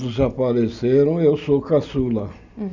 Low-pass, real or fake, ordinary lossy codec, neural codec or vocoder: 7.2 kHz; real; none; none